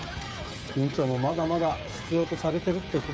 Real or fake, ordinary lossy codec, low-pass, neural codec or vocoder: fake; none; none; codec, 16 kHz, 16 kbps, FreqCodec, smaller model